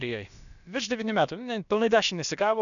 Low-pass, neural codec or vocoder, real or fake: 7.2 kHz; codec, 16 kHz, about 1 kbps, DyCAST, with the encoder's durations; fake